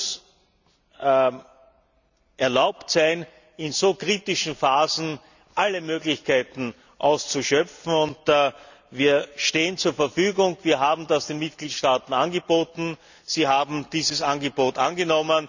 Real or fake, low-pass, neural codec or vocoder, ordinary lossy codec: real; 7.2 kHz; none; none